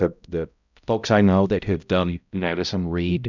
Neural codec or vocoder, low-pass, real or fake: codec, 16 kHz, 0.5 kbps, X-Codec, HuBERT features, trained on balanced general audio; 7.2 kHz; fake